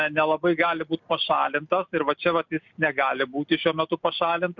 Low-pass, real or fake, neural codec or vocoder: 7.2 kHz; real; none